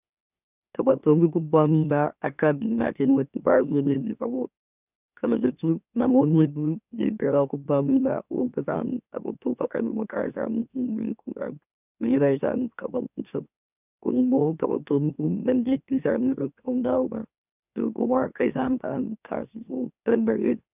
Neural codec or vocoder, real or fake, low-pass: autoencoder, 44.1 kHz, a latent of 192 numbers a frame, MeloTTS; fake; 3.6 kHz